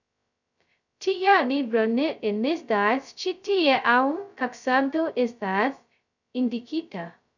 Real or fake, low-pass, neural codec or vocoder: fake; 7.2 kHz; codec, 16 kHz, 0.2 kbps, FocalCodec